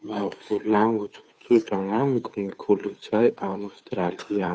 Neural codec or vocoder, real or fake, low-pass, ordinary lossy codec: codec, 16 kHz, 2 kbps, FunCodec, trained on Chinese and English, 25 frames a second; fake; none; none